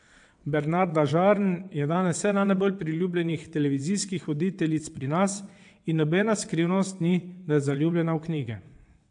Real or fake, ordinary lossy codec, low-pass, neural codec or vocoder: fake; AAC, 64 kbps; 9.9 kHz; vocoder, 22.05 kHz, 80 mel bands, WaveNeXt